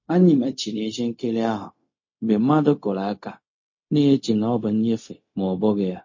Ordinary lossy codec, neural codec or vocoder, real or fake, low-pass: MP3, 32 kbps; codec, 16 kHz, 0.4 kbps, LongCat-Audio-Codec; fake; 7.2 kHz